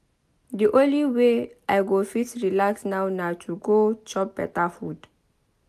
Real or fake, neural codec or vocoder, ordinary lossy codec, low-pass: real; none; none; 14.4 kHz